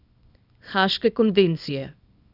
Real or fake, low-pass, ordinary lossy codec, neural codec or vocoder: fake; 5.4 kHz; none; codec, 24 kHz, 0.9 kbps, WavTokenizer, small release